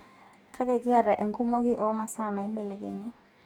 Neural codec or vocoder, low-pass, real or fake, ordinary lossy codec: codec, 44.1 kHz, 2.6 kbps, DAC; 19.8 kHz; fake; none